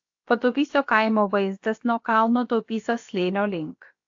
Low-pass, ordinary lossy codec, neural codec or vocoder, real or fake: 7.2 kHz; AAC, 48 kbps; codec, 16 kHz, about 1 kbps, DyCAST, with the encoder's durations; fake